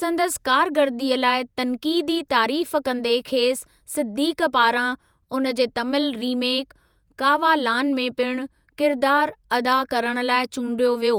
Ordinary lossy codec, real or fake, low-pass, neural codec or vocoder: none; fake; none; vocoder, 48 kHz, 128 mel bands, Vocos